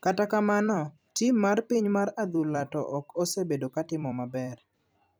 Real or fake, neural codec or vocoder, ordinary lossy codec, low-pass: fake; vocoder, 44.1 kHz, 128 mel bands every 256 samples, BigVGAN v2; none; none